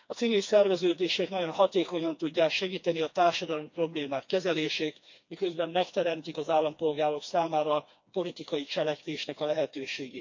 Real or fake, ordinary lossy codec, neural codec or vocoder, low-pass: fake; MP3, 48 kbps; codec, 16 kHz, 2 kbps, FreqCodec, smaller model; 7.2 kHz